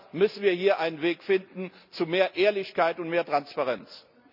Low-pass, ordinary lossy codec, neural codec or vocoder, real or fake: 5.4 kHz; none; none; real